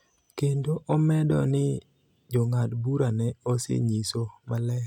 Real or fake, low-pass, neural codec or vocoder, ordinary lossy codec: real; 19.8 kHz; none; none